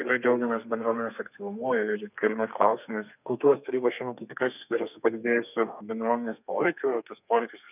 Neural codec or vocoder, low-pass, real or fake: codec, 32 kHz, 1.9 kbps, SNAC; 3.6 kHz; fake